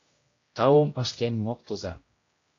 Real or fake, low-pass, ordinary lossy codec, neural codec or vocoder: fake; 7.2 kHz; AAC, 32 kbps; codec, 16 kHz, 0.5 kbps, X-Codec, HuBERT features, trained on general audio